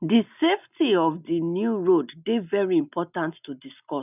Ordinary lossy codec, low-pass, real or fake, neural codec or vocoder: none; 3.6 kHz; real; none